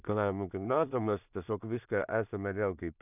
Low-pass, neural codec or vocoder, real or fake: 3.6 kHz; codec, 16 kHz in and 24 kHz out, 0.4 kbps, LongCat-Audio-Codec, two codebook decoder; fake